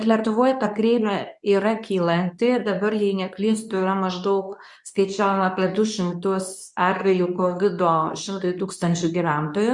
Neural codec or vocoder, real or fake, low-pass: codec, 24 kHz, 0.9 kbps, WavTokenizer, medium speech release version 2; fake; 10.8 kHz